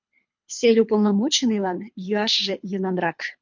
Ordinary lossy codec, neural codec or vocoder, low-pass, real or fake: MP3, 48 kbps; codec, 24 kHz, 3 kbps, HILCodec; 7.2 kHz; fake